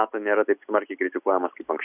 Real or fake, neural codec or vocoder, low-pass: real; none; 3.6 kHz